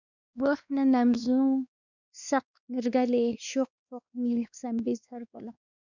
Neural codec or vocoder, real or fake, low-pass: codec, 16 kHz, 2 kbps, X-Codec, WavLM features, trained on Multilingual LibriSpeech; fake; 7.2 kHz